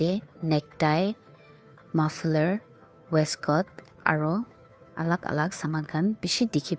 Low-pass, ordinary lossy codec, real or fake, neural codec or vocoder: none; none; fake; codec, 16 kHz, 2 kbps, FunCodec, trained on Chinese and English, 25 frames a second